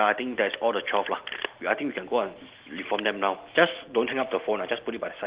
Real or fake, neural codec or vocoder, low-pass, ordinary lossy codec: real; none; 3.6 kHz; Opus, 16 kbps